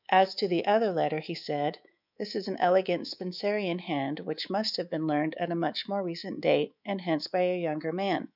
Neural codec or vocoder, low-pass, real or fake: codec, 24 kHz, 3.1 kbps, DualCodec; 5.4 kHz; fake